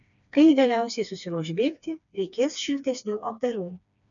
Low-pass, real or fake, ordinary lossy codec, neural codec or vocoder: 7.2 kHz; fake; MP3, 96 kbps; codec, 16 kHz, 2 kbps, FreqCodec, smaller model